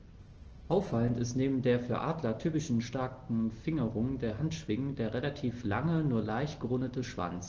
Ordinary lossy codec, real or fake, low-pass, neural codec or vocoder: Opus, 16 kbps; real; 7.2 kHz; none